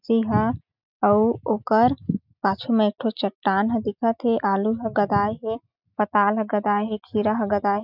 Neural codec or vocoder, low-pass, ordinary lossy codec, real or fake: none; 5.4 kHz; none; real